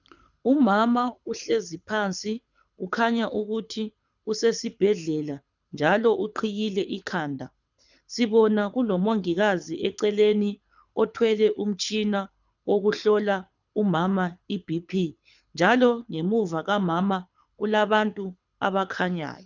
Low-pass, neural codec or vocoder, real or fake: 7.2 kHz; codec, 24 kHz, 6 kbps, HILCodec; fake